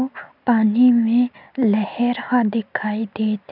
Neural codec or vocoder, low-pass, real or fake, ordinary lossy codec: none; 5.4 kHz; real; none